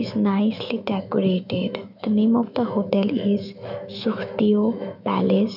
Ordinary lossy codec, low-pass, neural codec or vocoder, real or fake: none; 5.4 kHz; autoencoder, 48 kHz, 128 numbers a frame, DAC-VAE, trained on Japanese speech; fake